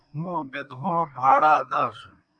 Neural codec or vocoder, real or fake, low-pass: codec, 24 kHz, 1 kbps, SNAC; fake; 9.9 kHz